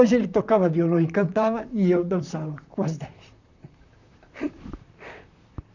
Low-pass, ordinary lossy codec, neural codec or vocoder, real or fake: 7.2 kHz; none; vocoder, 44.1 kHz, 128 mel bands, Pupu-Vocoder; fake